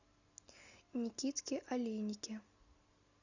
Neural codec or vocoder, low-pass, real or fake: none; 7.2 kHz; real